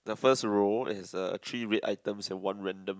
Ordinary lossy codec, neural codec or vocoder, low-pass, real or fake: none; none; none; real